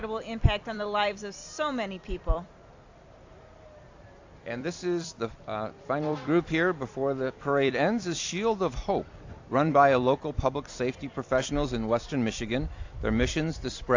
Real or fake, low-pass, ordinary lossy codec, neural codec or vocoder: fake; 7.2 kHz; AAC, 48 kbps; vocoder, 44.1 kHz, 128 mel bands every 256 samples, BigVGAN v2